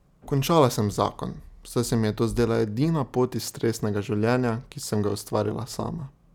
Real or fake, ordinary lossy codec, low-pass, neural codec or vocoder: real; none; 19.8 kHz; none